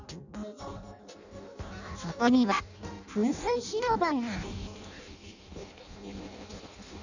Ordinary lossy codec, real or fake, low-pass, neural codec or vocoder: none; fake; 7.2 kHz; codec, 16 kHz in and 24 kHz out, 0.6 kbps, FireRedTTS-2 codec